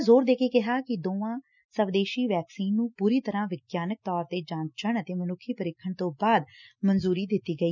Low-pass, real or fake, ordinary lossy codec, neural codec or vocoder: 7.2 kHz; real; none; none